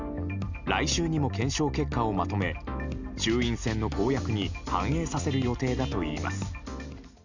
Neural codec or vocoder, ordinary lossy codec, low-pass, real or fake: none; none; 7.2 kHz; real